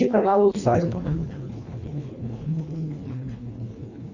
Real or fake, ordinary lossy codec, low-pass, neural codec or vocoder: fake; Opus, 64 kbps; 7.2 kHz; codec, 24 kHz, 1.5 kbps, HILCodec